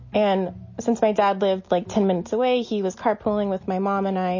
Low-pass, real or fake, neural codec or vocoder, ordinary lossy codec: 7.2 kHz; real; none; MP3, 32 kbps